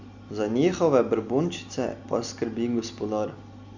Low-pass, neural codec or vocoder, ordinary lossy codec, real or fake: 7.2 kHz; none; Opus, 64 kbps; real